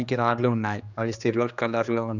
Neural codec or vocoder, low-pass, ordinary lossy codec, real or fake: codec, 16 kHz, 2 kbps, X-Codec, HuBERT features, trained on general audio; 7.2 kHz; none; fake